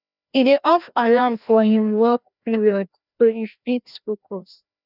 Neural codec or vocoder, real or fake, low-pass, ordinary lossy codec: codec, 16 kHz, 1 kbps, FreqCodec, larger model; fake; 5.4 kHz; none